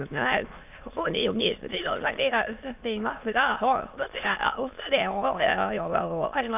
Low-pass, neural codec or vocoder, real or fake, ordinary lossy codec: 3.6 kHz; autoencoder, 22.05 kHz, a latent of 192 numbers a frame, VITS, trained on many speakers; fake; AAC, 32 kbps